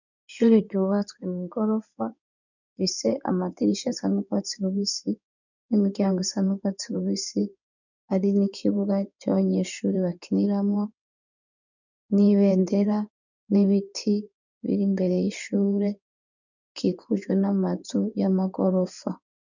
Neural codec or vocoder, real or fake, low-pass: codec, 16 kHz in and 24 kHz out, 2.2 kbps, FireRedTTS-2 codec; fake; 7.2 kHz